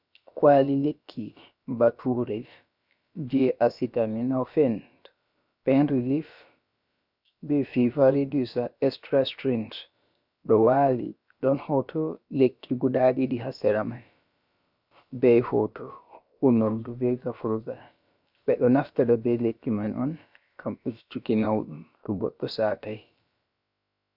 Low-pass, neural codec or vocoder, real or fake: 5.4 kHz; codec, 16 kHz, about 1 kbps, DyCAST, with the encoder's durations; fake